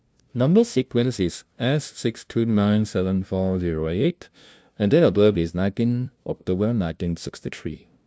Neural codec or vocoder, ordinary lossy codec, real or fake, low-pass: codec, 16 kHz, 0.5 kbps, FunCodec, trained on LibriTTS, 25 frames a second; none; fake; none